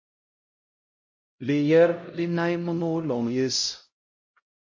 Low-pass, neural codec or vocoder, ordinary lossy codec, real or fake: 7.2 kHz; codec, 16 kHz, 0.5 kbps, X-Codec, HuBERT features, trained on LibriSpeech; MP3, 32 kbps; fake